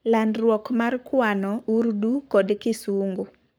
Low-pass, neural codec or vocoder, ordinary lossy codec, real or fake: none; codec, 44.1 kHz, 7.8 kbps, Pupu-Codec; none; fake